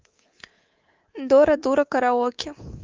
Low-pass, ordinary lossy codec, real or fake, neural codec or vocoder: 7.2 kHz; Opus, 32 kbps; fake; codec, 24 kHz, 3.1 kbps, DualCodec